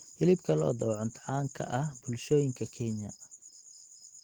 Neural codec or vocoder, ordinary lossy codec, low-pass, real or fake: none; Opus, 16 kbps; 19.8 kHz; real